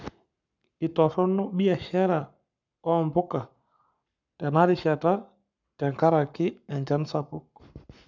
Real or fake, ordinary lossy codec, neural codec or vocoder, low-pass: fake; none; codec, 44.1 kHz, 7.8 kbps, Pupu-Codec; 7.2 kHz